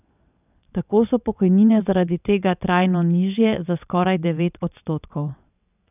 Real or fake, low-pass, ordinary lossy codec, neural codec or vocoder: fake; 3.6 kHz; none; vocoder, 22.05 kHz, 80 mel bands, WaveNeXt